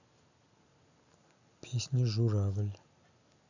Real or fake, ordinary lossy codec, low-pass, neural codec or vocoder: real; none; 7.2 kHz; none